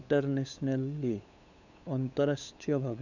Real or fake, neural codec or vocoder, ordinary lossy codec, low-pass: fake; codec, 16 kHz, 8 kbps, FunCodec, trained on LibriTTS, 25 frames a second; none; 7.2 kHz